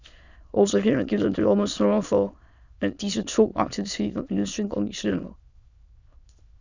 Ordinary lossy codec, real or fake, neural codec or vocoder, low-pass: Opus, 64 kbps; fake; autoencoder, 22.05 kHz, a latent of 192 numbers a frame, VITS, trained on many speakers; 7.2 kHz